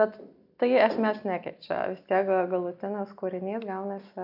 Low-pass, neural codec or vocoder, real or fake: 5.4 kHz; none; real